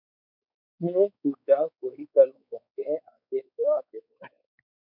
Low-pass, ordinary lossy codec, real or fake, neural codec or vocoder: 5.4 kHz; MP3, 48 kbps; fake; codec, 16 kHz, 4 kbps, X-Codec, WavLM features, trained on Multilingual LibriSpeech